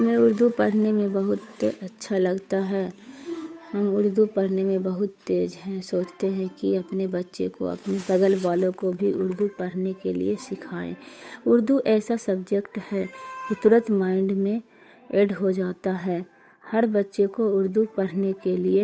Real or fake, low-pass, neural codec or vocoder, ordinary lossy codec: fake; none; codec, 16 kHz, 8 kbps, FunCodec, trained on Chinese and English, 25 frames a second; none